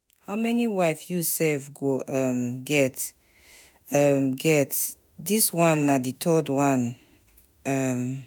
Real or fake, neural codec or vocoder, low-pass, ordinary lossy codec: fake; autoencoder, 48 kHz, 32 numbers a frame, DAC-VAE, trained on Japanese speech; none; none